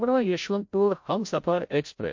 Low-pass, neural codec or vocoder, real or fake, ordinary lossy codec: 7.2 kHz; codec, 16 kHz, 0.5 kbps, FreqCodec, larger model; fake; MP3, 48 kbps